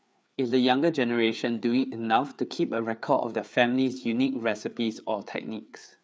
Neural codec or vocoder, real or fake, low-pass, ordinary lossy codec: codec, 16 kHz, 4 kbps, FreqCodec, larger model; fake; none; none